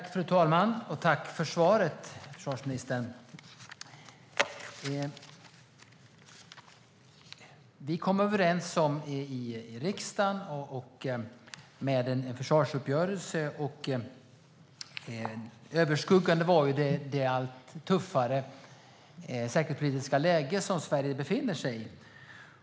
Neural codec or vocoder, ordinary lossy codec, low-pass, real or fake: none; none; none; real